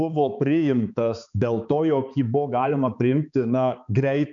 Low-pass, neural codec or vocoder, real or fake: 7.2 kHz; codec, 16 kHz, 4 kbps, X-Codec, HuBERT features, trained on balanced general audio; fake